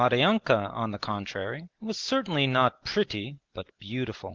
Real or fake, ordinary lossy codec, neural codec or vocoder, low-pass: real; Opus, 16 kbps; none; 7.2 kHz